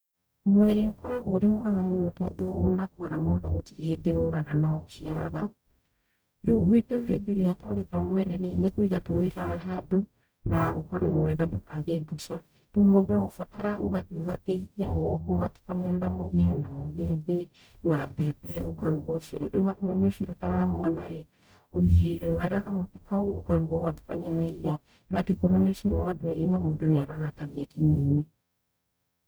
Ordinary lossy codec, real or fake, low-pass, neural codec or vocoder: none; fake; none; codec, 44.1 kHz, 0.9 kbps, DAC